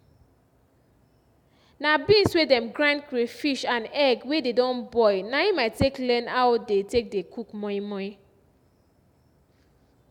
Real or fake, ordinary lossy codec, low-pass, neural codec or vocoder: real; none; 19.8 kHz; none